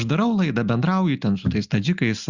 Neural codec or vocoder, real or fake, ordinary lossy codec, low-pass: none; real; Opus, 64 kbps; 7.2 kHz